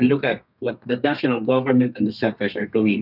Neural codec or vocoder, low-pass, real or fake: codec, 32 kHz, 1.9 kbps, SNAC; 5.4 kHz; fake